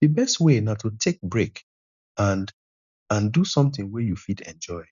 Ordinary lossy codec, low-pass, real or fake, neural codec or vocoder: none; 7.2 kHz; real; none